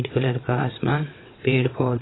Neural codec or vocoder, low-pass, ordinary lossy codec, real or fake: vocoder, 22.05 kHz, 80 mel bands, WaveNeXt; 7.2 kHz; AAC, 16 kbps; fake